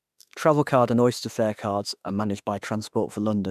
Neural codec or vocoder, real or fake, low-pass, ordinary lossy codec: autoencoder, 48 kHz, 32 numbers a frame, DAC-VAE, trained on Japanese speech; fake; 14.4 kHz; none